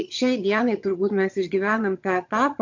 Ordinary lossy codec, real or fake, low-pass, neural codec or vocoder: AAC, 48 kbps; fake; 7.2 kHz; vocoder, 22.05 kHz, 80 mel bands, HiFi-GAN